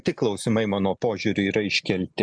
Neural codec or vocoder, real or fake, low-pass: none; real; 9.9 kHz